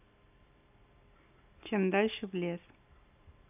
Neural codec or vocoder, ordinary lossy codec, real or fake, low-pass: none; none; real; 3.6 kHz